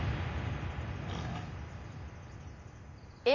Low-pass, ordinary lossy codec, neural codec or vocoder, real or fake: 7.2 kHz; none; none; real